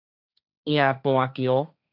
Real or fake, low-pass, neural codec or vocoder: fake; 5.4 kHz; codec, 16 kHz, 1.1 kbps, Voila-Tokenizer